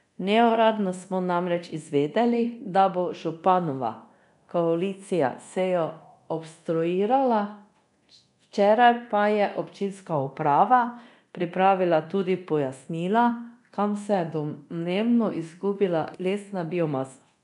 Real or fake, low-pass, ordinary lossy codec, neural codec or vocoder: fake; 10.8 kHz; none; codec, 24 kHz, 0.9 kbps, DualCodec